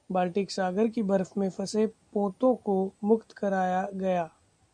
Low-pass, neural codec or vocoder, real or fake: 9.9 kHz; none; real